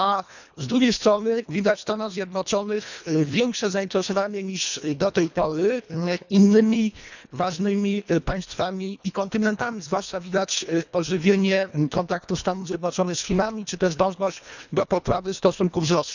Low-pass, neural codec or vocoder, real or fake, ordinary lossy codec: 7.2 kHz; codec, 24 kHz, 1.5 kbps, HILCodec; fake; none